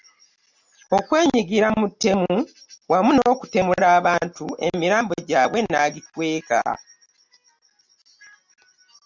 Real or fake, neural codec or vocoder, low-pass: real; none; 7.2 kHz